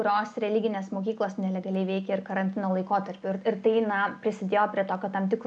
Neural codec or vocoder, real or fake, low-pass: none; real; 9.9 kHz